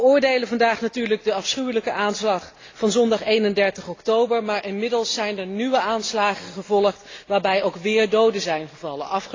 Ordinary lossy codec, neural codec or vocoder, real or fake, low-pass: AAC, 32 kbps; none; real; 7.2 kHz